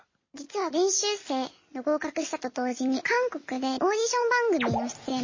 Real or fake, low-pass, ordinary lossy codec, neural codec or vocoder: real; 7.2 kHz; MP3, 32 kbps; none